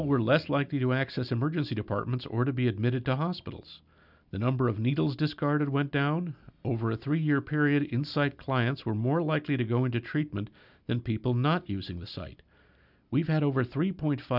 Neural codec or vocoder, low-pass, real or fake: none; 5.4 kHz; real